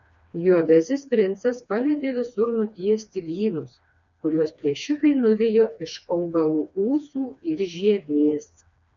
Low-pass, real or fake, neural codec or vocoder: 7.2 kHz; fake; codec, 16 kHz, 2 kbps, FreqCodec, smaller model